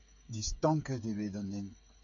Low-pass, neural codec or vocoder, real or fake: 7.2 kHz; codec, 16 kHz, 8 kbps, FreqCodec, smaller model; fake